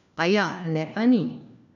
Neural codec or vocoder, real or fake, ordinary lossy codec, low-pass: codec, 16 kHz, 1 kbps, FunCodec, trained on LibriTTS, 50 frames a second; fake; none; 7.2 kHz